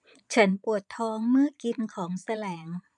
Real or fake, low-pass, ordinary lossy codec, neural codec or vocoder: fake; 9.9 kHz; none; vocoder, 22.05 kHz, 80 mel bands, Vocos